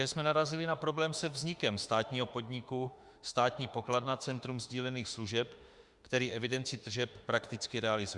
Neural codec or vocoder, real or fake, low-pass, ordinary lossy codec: autoencoder, 48 kHz, 32 numbers a frame, DAC-VAE, trained on Japanese speech; fake; 10.8 kHz; Opus, 64 kbps